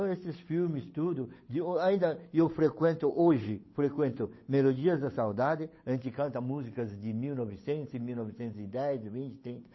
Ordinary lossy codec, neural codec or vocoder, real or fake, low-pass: MP3, 24 kbps; codec, 16 kHz, 8 kbps, FunCodec, trained on Chinese and English, 25 frames a second; fake; 7.2 kHz